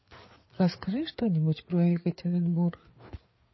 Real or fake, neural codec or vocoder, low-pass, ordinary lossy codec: fake; codec, 16 kHz, 4 kbps, FreqCodec, larger model; 7.2 kHz; MP3, 24 kbps